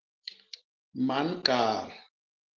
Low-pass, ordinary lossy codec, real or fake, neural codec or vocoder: 7.2 kHz; Opus, 16 kbps; real; none